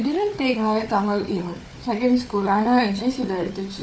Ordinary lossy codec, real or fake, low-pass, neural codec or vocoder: none; fake; none; codec, 16 kHz, 4 kbps, FunCodec, trained on Chinese and English, 50 frames a second